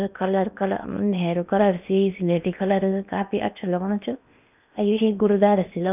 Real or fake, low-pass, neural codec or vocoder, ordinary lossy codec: fake; 3.6 kHz; codec, 16 kHz in and 24 kHz out, 0.8 kbps, FocalCodec, streaming, 65536 codes; none